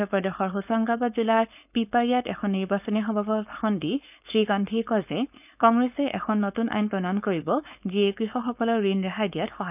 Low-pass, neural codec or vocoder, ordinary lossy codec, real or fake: 3.6 kHz; codec, 16 kHz, 4.8 kbps, FACodec; none; fake